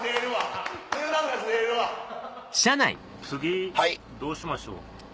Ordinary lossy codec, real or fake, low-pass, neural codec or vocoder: none; real; none; none